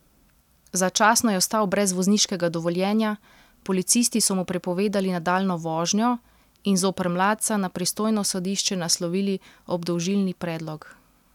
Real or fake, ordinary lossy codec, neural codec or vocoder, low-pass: real; none; none; 19.8 kHz